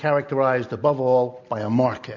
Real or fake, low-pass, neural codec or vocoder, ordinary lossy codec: real; 7.2 kHz; none; AAC, 48 kbps